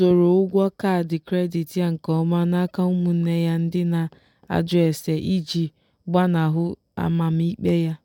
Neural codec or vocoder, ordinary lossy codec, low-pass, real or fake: none; none; 19.8 kHz; real